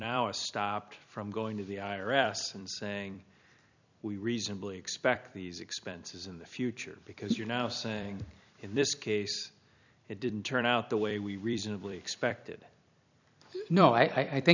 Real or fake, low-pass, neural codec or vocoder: fake; 7.2 kHz; vocoder, 44.1 kHz, 128 mel bands every 256 samples, BigVGAN v2